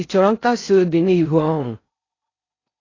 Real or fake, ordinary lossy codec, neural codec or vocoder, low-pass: fake; AAC, 32 kbps; codec, 16 kHz in and 24 kHz out, 0.6 kbps, FocalCodec, streaming, 4096 codes; 7.2 kHz